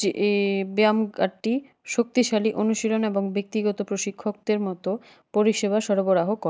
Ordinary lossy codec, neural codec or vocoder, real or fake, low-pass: none; none; real; none